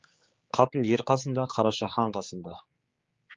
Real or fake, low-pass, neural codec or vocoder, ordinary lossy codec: fake; 7.2 kHz; codec, 16 kHz, 4 kbps, X-Codec, HuBERT features, trained on general audio; Opus, 32 kbps